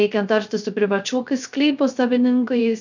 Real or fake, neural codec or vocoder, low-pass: fake; codec, 16 kHz, 0.3 kbps, FocalCodec; 7.2 kHz